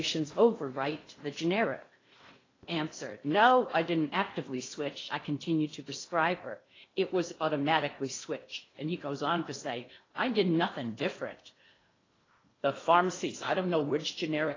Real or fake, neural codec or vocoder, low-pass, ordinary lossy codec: fake; codec, 16 kHz in and 24 kHz out, 0.8 kbps, FocalCodec, streaming, 65536 codes; 7.2 kHz; AAC, 32 kbps